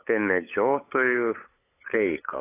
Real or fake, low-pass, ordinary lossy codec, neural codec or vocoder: fake; 3.6 kHz; AAC, 16 kbps; codec, 16 kHz, 8 kbps, FunCodec, trained on LibriTTS, 25 frames a second